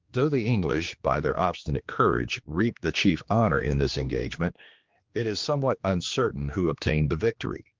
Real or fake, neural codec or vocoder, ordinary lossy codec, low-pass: fake; codec, 16 kHz, 2 kbps, X-Codec, HuBERT features, trained on general audio; Opus, 32 kbps; 7.2 kHz